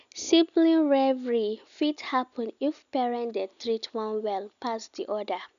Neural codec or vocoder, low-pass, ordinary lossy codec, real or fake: none; 7.2 kHz; none; real